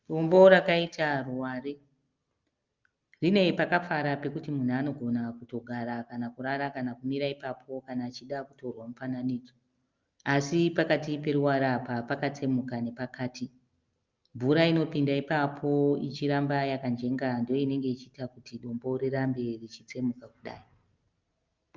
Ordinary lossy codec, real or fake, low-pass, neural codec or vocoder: Opus, 24 kbps; real; 7.2 kHz; none